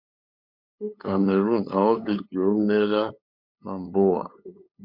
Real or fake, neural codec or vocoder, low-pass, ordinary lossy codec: fake; codec, 16 kHz, 8 kbps, FunCodec, trained on LibriTTS, 25 frames a second; 5.4 kHz; MP3, 48 kbps